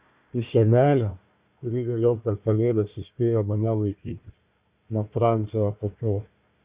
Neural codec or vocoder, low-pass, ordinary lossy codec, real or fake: codec, 16 kHz, 1 kbps, FunCodec, trained on Chinese and English, 50 frames a second; 3.6 kHz; Opus, 64 kbps; fake